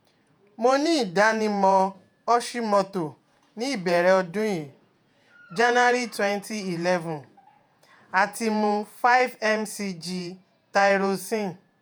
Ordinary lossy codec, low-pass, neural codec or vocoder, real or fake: none; none; vocoder, 48 kHz, 128 mel bands, Vocos; fake